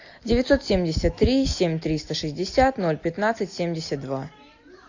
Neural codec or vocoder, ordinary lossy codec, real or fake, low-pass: none; AAC, 48 kbps; real; 7.2 kHz